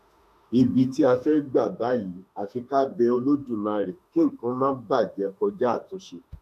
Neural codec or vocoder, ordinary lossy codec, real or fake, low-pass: autoencoder, 48 kHz, 32 numbers a frame, DAC-VAE, trained on Japanese speech; none; fake; 14.4 kHz